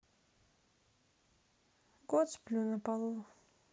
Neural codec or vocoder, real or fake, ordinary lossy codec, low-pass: none; real; none; none